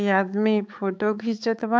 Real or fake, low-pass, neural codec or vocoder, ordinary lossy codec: fake; none; codec, 16 kHz, 4 kbps, X-Codec, HuBERT features, trained on balanced general audio; none